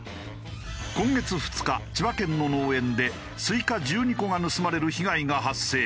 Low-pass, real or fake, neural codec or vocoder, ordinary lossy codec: none; real; none; none